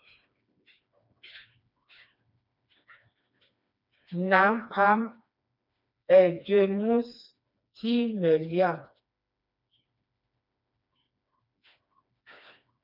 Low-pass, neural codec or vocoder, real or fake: 5.4 kHz; codec, 16 kHz, 2 kbps, FreqCodec, smaller model; fake